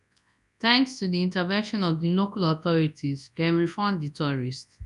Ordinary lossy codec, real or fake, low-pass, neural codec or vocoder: none; fake; 10.8 kHz; codec, 24 kHz, 0.9 kbps, WavTokenizer, large speech release